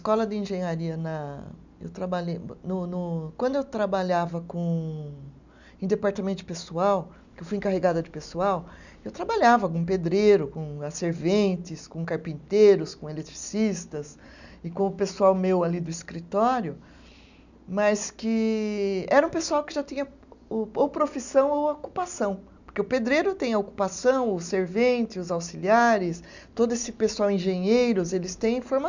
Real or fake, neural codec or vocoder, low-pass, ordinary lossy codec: real; none; 7.2 kHz; none